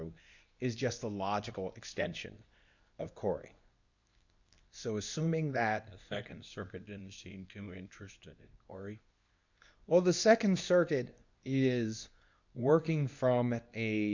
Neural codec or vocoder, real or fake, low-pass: codec, 24 kHz, 0.9 kbps, WavTokenizer, medium speech release version 2; fake; 7.2 kHz